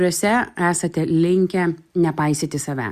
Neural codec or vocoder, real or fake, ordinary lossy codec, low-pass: none; real; Opus, 64 kbps; 14.4 kHz